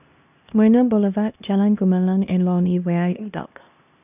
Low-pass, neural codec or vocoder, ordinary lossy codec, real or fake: 3.6 kHz; codec, 16 kHz, 1 kbps, X-Codec, HuBERT features, trained on LibriSpeech; none; fake